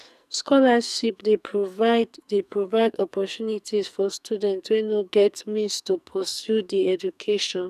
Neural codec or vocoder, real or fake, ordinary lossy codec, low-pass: codec, 44.1 kHz, 2.6 kbps, SNAC; fake; none; 14.4 kHz